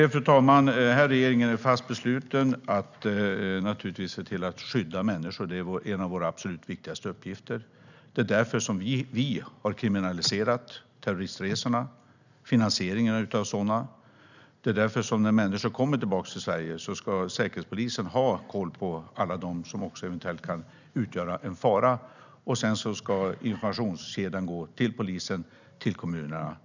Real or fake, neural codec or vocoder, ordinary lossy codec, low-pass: real; none; none; 7.2 kHz